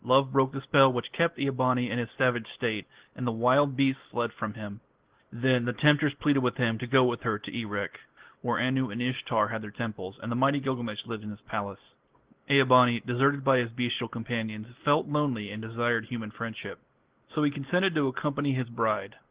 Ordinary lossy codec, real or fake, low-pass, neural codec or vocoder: Opus, 16 kbps; real; 3.6 kHz; none